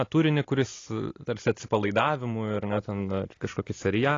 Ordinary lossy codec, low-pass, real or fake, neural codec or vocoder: AAC, 32 kbps; 7.2 kHz; real; none